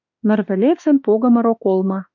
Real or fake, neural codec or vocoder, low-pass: fake; autoencoder, 48 kHz, 32 numbers a frame, DAC-VAE, trained on Japanese speech; 7.2 kHz